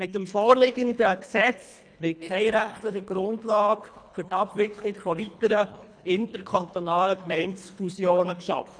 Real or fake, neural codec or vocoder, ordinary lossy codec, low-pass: fake; codec, 24 kHz, 1.5 kbps, HILCodec; none; 9.9 kHz